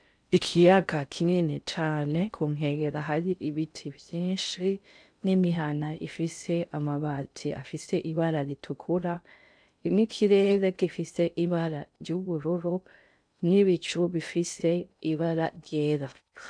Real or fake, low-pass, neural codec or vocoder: fake; 9.9 kHz; codec, 16 kHz in and 24 kHz out, 0.6 kbps, FocalCodec, streaming, 4096 codes